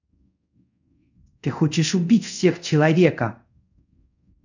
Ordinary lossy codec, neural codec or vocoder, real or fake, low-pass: none; codec, 24 kHz, 0.5 kbps, DualCodec; fake; 7.2 kHz